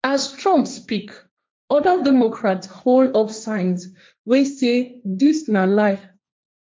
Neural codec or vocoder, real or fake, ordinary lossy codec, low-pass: codec, 16 kHz, 1.1 kbps, Voila-Tokenizer; fake; none; none